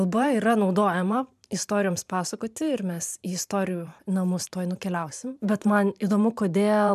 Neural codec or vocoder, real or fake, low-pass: vocoder, 44.1 kHz, 128 mel bands every 512 samples, BigVGAN v2; fake; 14.4 kHz